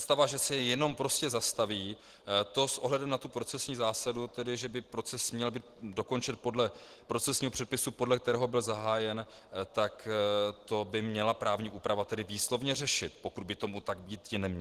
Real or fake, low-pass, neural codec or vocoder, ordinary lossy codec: real; 14.4 kHz; none; Opus, 16 kbps